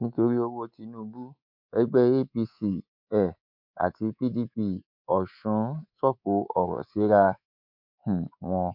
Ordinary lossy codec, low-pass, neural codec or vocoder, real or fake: MP3, 48 kbps; 5.4 kHz; codec, 24 kHz, 3.1 kbps, DualCodec; fake